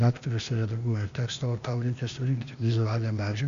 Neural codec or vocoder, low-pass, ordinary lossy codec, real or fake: codec, 16 kHz, 0.8 kbps, ZipCodec; 7.2 kHz; Opus, 64 kbps; fake